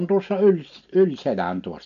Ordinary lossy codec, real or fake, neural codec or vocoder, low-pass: MP3, 64 kbps; real; none; 7.2 kHz